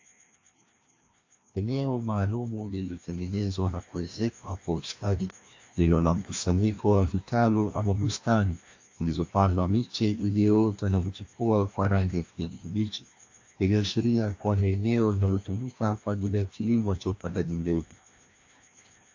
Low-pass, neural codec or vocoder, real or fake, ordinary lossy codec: 7.2 kHz; codec, 16 kHz, 1 kbps, FreqCodec, larger model; fake; AAC, 48 kbps